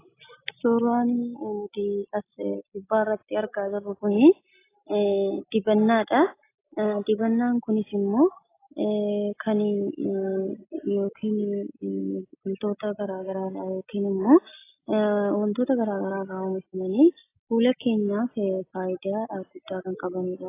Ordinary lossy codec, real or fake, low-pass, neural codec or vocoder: AAC, 24 kbps; real; 3.6 kHz; none